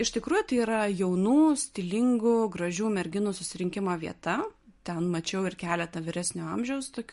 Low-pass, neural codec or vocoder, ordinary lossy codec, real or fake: 10.8 kHz; none; MP3, 48 kbps; real